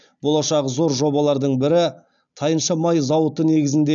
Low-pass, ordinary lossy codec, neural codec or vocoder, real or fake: 7.2 kHz; none; none; real